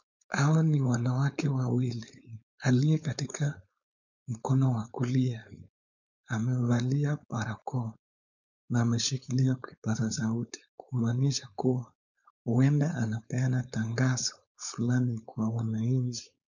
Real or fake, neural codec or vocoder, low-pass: fake; codec, 16 kHz, 4.8 kbps, FACodec; 7.2 kHz